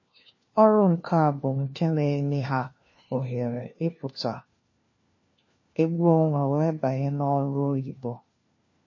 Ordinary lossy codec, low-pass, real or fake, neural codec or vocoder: MP3, 32 kbps; 7.2 kHz; fake; codec, 16 kHz, 1 kbps, FunCodec, trained on LibriTTS, 50 frames a second